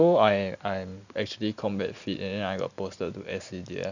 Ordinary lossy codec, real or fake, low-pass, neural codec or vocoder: none; real; 7.2 kHz; none